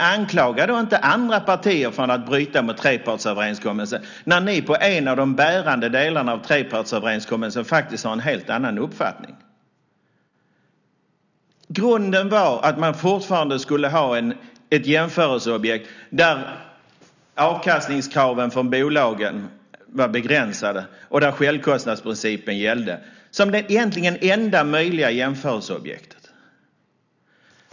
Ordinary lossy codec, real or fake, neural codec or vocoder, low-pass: none; real; none; 7.2 kHz